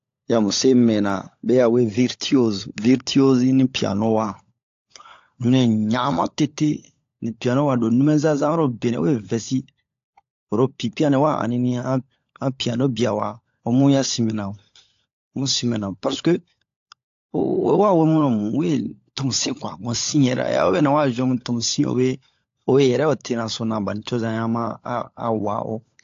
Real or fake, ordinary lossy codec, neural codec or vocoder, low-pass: fake; AAC, 48 kbps; codec, 16 kHz, 16 kbps, FunCodec, trained on LibriTTS, 50 frames a second; 7.2 kHz